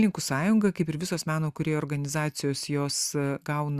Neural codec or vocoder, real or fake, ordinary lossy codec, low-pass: none; real; Opus, 64 kbps; 14.4 kHz